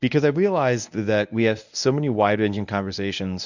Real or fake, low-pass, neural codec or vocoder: fake; 7.2 kHz; codec, 24 kHz, 0.9 kbps, WavTokenizer, medium speech release version 1